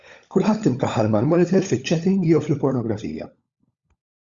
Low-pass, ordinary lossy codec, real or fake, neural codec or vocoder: 7.2 kHz; Opus, 64 kbps; fake; codec, 16 kHz, 16 kbps, FunCodec, trained on LibriTTS, 50 frames a second